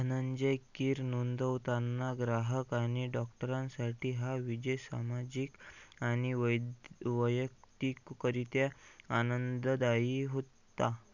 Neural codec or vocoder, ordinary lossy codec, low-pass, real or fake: none; none; 7.2 kHz; real